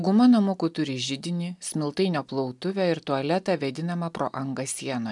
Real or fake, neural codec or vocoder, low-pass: real; none; 10.8 kHz